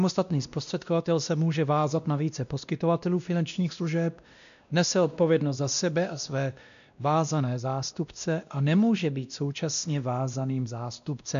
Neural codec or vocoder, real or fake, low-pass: codec, 16 kHz, 1 kbps, X-Codec, WavLM features, trained on Multilingual LibriSpeech; fake; 7.2 kHz